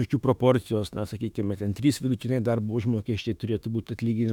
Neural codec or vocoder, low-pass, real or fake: autoencoder, 48 kHz, 32 numbers a frame, DAC-VAE, trained on Japanese speech; 19.8 kHz; fake